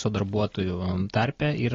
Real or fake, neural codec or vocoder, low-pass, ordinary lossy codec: real; none; 7.2 kHz; AAC, 32 kbps